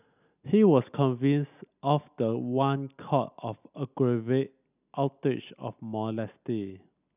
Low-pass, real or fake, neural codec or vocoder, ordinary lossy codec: 3.6 kHz; real; none; none